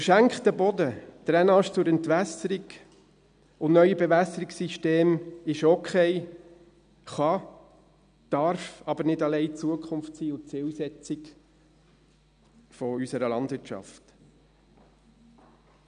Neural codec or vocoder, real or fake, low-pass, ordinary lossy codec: none; real; 9.9 kHz; none